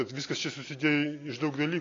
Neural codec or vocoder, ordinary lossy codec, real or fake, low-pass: none; AAC, 32 kbps; real; 7.2 kHz